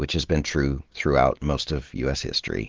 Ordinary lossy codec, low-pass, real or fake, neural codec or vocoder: Opus, 16 kbps; 7.2 kHz; real; none